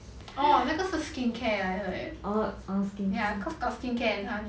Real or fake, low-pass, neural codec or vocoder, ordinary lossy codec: real; none; none; none